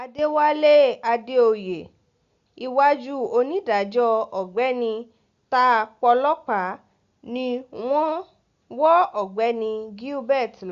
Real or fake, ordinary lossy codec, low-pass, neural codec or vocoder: real; Opus, 64 kbps; 7.2 kHz; none